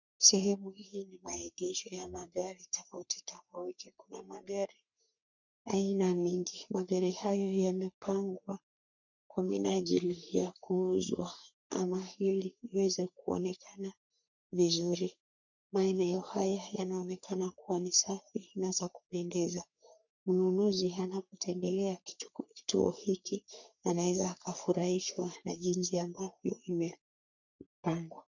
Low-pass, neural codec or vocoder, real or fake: 7.2 kHz; codec, 44.1 kHz, 3.4 kbps, Pupu-Codec; fake